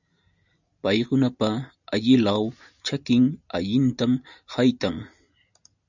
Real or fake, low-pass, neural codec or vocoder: real; 7.2 kHz; none